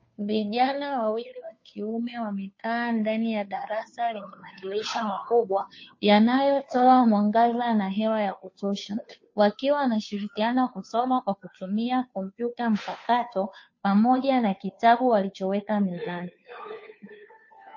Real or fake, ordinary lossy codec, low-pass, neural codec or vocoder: fake; MP3, 32 kbps; 7.2 kHz; codec, 16 kHz, 2 kbps, FunCodec, trained on Chinese and English, 25 frames a second